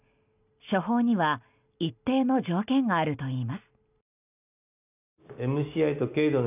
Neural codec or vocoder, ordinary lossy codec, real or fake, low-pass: none; none; real; 3.6 kHz